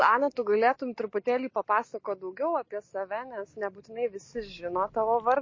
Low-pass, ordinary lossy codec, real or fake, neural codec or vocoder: 7.2 kHz; MP3, 32 kbps; real; none